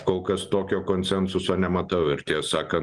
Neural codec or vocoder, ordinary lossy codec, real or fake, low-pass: none; Opus, 24 kbps; real; 10.8 kHz